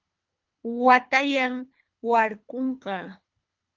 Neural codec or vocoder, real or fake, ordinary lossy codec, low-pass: codec, 24 kHz, 3 kbps, HILCodec; fake; Opus, 24 kbps; 7.2 kHz